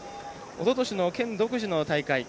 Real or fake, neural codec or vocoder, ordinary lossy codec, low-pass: real; none; none; none